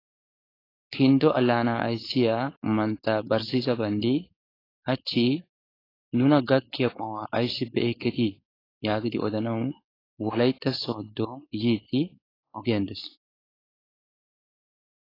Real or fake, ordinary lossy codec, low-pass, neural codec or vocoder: fake; AAC, 24 kbps; 5.4 kHz; codec, 16 kHz, 4.8 kbps, FACodec